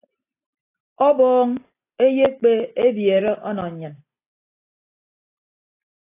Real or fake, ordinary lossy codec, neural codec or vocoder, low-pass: real; AAC, 24 kbps; none; 3.6 kHz